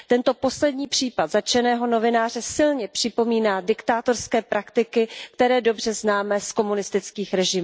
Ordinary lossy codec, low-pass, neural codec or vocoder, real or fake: none; none; none; real